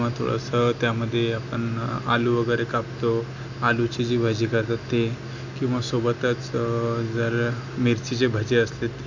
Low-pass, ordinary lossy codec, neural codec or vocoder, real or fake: 7.2 kHz; none; none; real